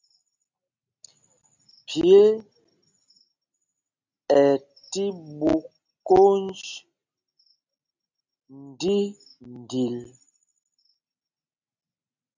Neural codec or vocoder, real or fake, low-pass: none; real; 7.2 kHz